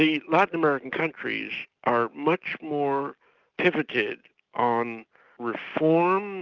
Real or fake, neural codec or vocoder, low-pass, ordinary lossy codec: real; none; 7.2 kHz; Opus, 24 kbps